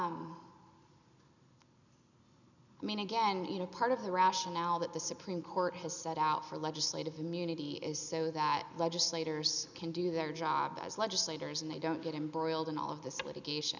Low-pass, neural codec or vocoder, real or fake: 7.2 kHz; none; real